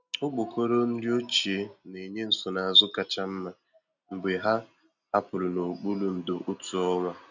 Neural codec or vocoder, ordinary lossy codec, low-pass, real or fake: none; none; 7.2 kHz; real